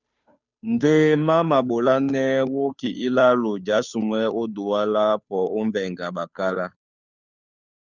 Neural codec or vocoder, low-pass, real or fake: codec, 16 kHz, 2 kbps, FunCodec, trained on Chinese and English, 25 frames a second; 7.2 kHz; fake